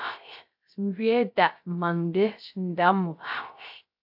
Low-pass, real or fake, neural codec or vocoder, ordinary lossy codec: 5.4 kHz; fake; codec, 16 kHz, 0.3 kbps, FocalCodec; AAC, 48 kbps